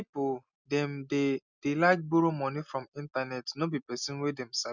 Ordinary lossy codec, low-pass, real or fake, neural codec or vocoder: none; 7.2 kHz; real; none